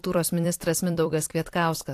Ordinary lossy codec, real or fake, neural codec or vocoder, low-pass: AAC, 64 kbps; fake; vocoder, 44.1 kHz, 128 mel bands every 256 samples, BigVGAN v2; 14.4 kHz